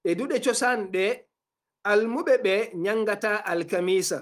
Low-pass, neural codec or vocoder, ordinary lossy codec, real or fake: 14.4 kHz; none; Opus, 32 kbps; real